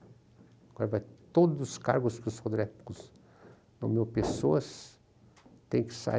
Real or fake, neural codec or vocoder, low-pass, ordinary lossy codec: real; none; none; none